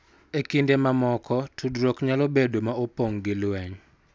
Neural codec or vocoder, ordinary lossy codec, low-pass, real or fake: none; none; none; real